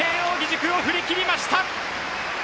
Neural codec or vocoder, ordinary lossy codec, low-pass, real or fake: none; none; none; real